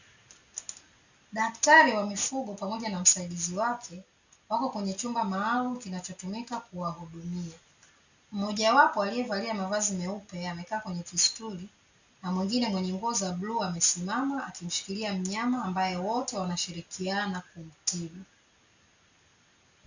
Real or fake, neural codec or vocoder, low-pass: real; none; 7.2 kHz